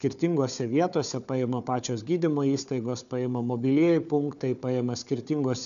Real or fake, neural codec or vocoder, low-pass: fake; codec, 16 kHz, 4 kbps, FunCodec, trained on Chinese and English, 50 frames a second; 7.2 kHz